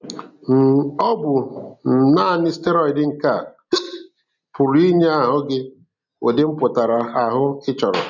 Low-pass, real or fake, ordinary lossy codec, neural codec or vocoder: 7.2 kHz; real; none; none